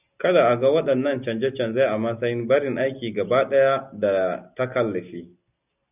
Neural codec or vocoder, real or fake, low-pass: none; real; 3.6 kHz